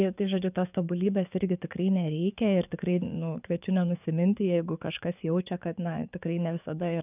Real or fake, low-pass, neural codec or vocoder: fake; 3.6 kHz; codec, 44.1 kHz, 7.8 kbps, DAC